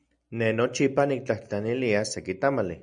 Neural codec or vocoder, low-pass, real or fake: none; 9.9 kHz; real